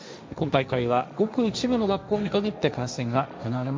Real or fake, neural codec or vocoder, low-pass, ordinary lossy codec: fake; codec, 16 kHz, 1.1 kbps, Voila-Tokenizer; none; none